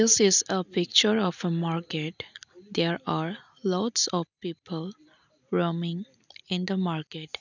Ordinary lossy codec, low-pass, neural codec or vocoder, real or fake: none; 7.2 kHz; none; real